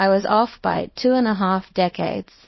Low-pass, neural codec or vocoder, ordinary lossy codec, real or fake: 7.2 kHz; codec, 24 kHz, 0.9 kbps, WavTokenizer, small release; MP3, 24 kbps; fake